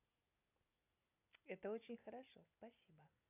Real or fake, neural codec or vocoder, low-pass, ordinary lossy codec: real; none; 3.6 kHz; none